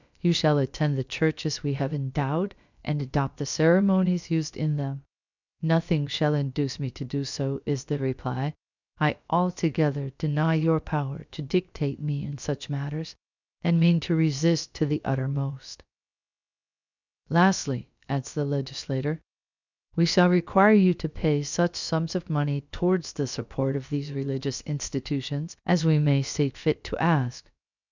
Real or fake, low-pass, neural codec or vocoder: fake; 7.2 kHz; codec, 16 kHz, about 1 kbps, DyCAST, with the encoder's durations